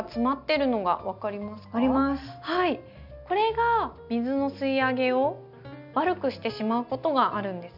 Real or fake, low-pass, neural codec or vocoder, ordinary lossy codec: real; 5.4 kHz; none; none